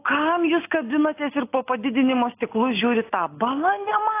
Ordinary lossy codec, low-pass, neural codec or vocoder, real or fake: AAC, 24 kbps; 3.6 kHz; none; real